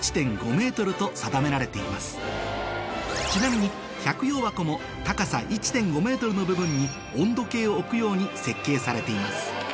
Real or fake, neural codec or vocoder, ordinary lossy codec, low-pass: real; none; none; none